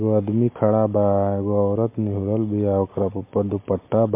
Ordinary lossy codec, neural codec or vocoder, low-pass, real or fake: MP3, 24 kbps; none; 3.6 kHz; real